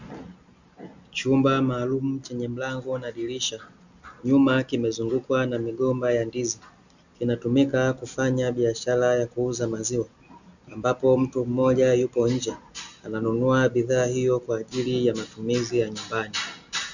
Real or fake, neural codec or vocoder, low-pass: real; none; 7.2 kHz